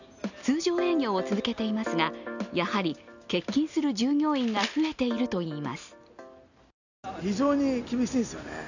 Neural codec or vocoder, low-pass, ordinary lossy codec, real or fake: none; 7.2 kHz; none; real